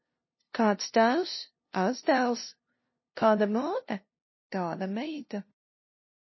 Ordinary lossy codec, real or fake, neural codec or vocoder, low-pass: MP3, 24 kbps; fake; codec, 16 kHz, 0.5 kbps, FunCodec, trained on LibriTTS, 25 frames a second; 7.2 kHz